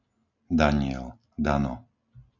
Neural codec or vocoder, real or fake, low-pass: none; real; 7.2 kHz